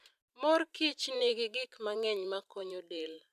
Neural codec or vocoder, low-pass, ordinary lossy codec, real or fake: vocoder, 44.1 kHz, 128 mel bands every 512 samples, BigVGAN v2; 14.4 kHz; none; fake